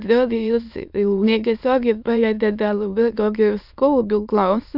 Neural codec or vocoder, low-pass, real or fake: autoencoder, 22.05 kHz, a latent of 192 numbers a frame, VITS, trained on many speakers; 5.4 kHz; fake